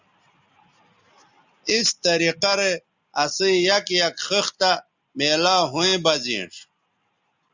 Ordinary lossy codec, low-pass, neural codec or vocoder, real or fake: Opus, 64 kbps; 7.2 kHz; none; real